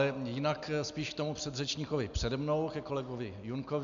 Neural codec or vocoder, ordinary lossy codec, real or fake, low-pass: none; MP3, 64 kbps; real; 7.2 kHz